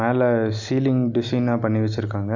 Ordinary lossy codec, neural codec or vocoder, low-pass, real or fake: none; none; 7.2 kHz; real